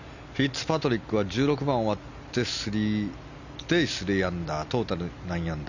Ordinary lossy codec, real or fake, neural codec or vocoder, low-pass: none; real; none; 7.2 kHz